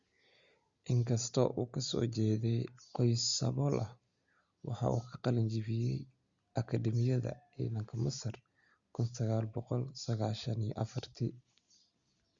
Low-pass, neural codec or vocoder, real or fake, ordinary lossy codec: 7.2 kHz; none; real; Opus, 64 kbps